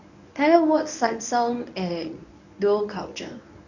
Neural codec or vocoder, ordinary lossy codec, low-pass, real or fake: codec, 24 kHz, 0.9 kbps, WavTokenizer, medium speech release version 1; none; 7.2 kHz; fake